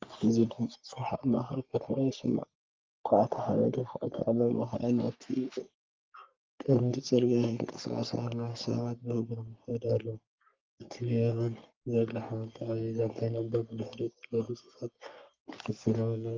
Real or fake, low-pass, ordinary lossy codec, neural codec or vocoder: fake; 7.2 kHz; Opus, 24 kbps; codec, 44.1 kHz, 3.4 kbps, Pupu-Codec